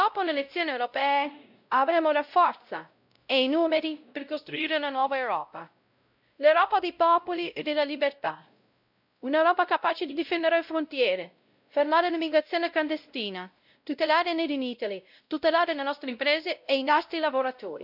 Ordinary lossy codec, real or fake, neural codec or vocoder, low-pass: none; fake; codec, 16 kHz, 0.5 kbps, X-Codec, WavLM features, trained on Multilingual LibriSpeech; 5.4 kHz